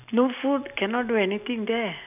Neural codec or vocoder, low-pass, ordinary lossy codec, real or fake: none; 3.6 kHz; none; real